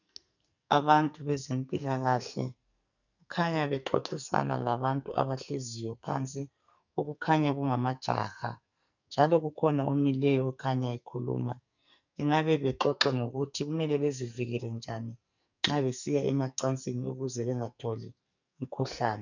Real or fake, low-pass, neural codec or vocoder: fake; 7.2 kHz; codec, 44.1 kHz, 2.6 kbps, SNAC